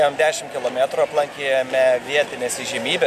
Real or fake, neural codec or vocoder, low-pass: real; none; 14.4 kHz